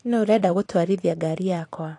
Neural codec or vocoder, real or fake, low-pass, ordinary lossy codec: vocoder, 44.1 kHz, 128 mel bands every 256 samples, BigVGAN v2; fake; 10.8 kHz; AAC, 48 kbps